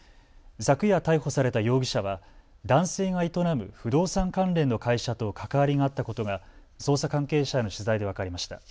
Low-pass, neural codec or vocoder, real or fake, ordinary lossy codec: none; none; real; none